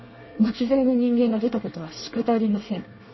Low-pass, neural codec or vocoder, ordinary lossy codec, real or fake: 7.2 kHz; codec, 24 kHz, 1 kbps, SNAC; MP3, 24 kbps; fake